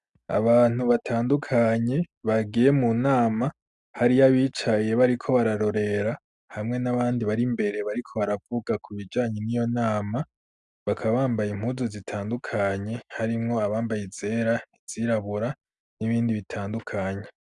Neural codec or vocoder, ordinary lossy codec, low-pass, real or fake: none; Opus, 64 kbps; 10.8 kHz; real